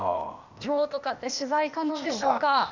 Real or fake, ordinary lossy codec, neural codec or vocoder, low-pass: fake; none; codec, 16 kHz, 0.8 kbps, ZipCodec; 7.2 kHz